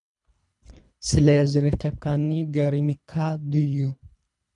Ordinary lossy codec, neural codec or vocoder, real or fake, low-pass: MP3, 96 kbps; codec, 24 kHz, 3 kbps, HILCodec; fake; 10.8 kHz